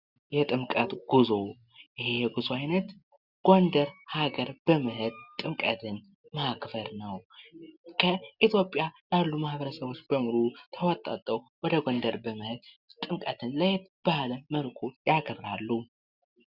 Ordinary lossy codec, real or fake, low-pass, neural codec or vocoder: Opus, 64 kbps; real; 5.4 kHz; none